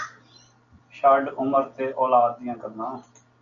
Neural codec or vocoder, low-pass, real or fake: none; 7.2 kHz; real